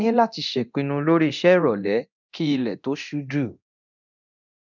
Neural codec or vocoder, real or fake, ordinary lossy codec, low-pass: codec, 24 kHz, 0.9 kbps, DualCodec; fake; none; 7.2 kHz